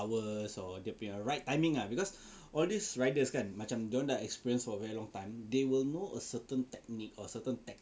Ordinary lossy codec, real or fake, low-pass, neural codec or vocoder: none; real; none; none